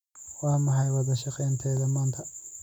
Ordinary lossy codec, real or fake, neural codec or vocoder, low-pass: none; real; none; 19.8 kHz